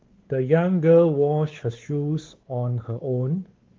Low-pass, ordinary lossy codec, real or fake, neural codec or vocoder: 7.2 kHz; Opus, 16 kbps; fake; codec, 16 kHz, 4 kbps, X-Codec, WavLM features, trained on Multilingual LibriSpeech